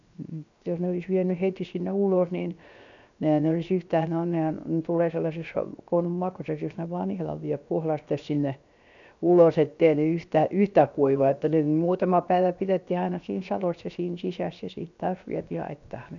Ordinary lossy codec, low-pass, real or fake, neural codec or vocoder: none; 7.2 kHz; fake; codec, 16 kHz, 0.7 kbps, FocalCodec